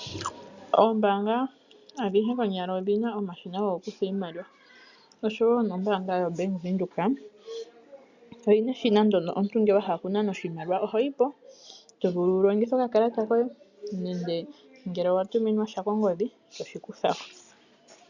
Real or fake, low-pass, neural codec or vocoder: real; 7.2 kHz; none